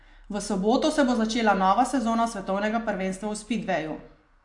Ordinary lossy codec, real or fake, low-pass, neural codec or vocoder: AAC, 64 kbps; real; 10.8 kHz; none